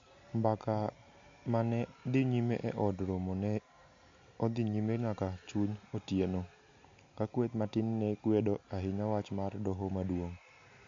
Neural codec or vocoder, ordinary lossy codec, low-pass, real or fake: none; MP3, 48 kbps; 7.2 kHz; real